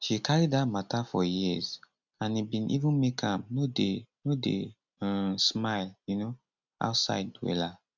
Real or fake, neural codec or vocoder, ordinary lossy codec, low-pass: real; none; none; 7.2 kHz